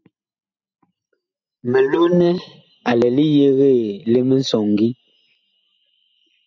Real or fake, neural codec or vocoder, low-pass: fake; vocoder, 44.1 kHz, 128 mel bands every 512 samples, BigVGAN v2; 7.2 kHz